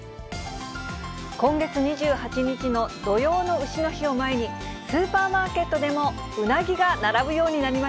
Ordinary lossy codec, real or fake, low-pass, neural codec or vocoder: none; real; none; none